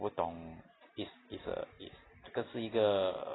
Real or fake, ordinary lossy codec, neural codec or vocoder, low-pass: real; AAC, 16 kbps; none; 7.2 kHz